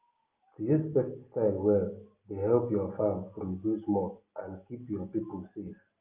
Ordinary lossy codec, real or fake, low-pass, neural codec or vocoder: Opus, 32 kbps; real; 3.6 kHz; none